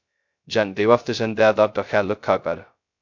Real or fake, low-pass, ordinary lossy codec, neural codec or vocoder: fake; 7.2 kHz; MP3, 64 kbps; codec, 16 kHz, 0.2 kbps, FocalCodec